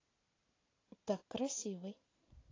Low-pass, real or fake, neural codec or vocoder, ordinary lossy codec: 7.2 kHz; real; none; AAC, 32 kbps